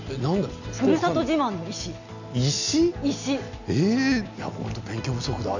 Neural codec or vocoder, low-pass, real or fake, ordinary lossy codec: none; 7.2 kHz; real; none